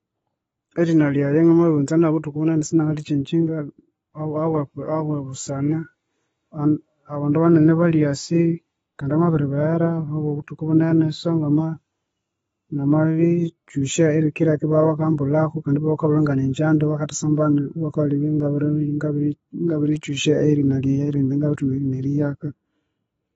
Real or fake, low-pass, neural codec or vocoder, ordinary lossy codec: real; 19.8 kHz; none; AAC, 24 kbps